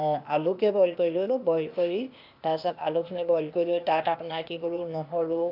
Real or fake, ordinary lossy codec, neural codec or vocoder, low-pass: fake; AAC, 48 kbps; codec, 16 kHz, 0.8 kbps, ZipCodec; 5.4 kHz